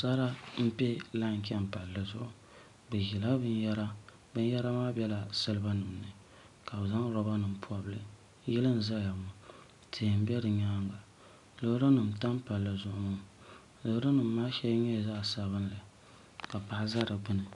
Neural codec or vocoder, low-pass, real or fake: none; 10.8 kHz; real